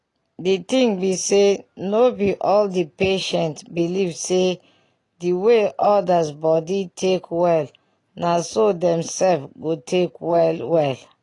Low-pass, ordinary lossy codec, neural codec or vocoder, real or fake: 10.8 kHz; AAC, 32 kbps; none; real